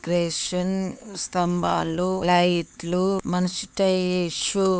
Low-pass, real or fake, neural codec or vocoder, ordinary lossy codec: none; fake; codec, 16 kHz, 4 kbps, X-Codec, HuBERT features, trained on LibriSpeech; none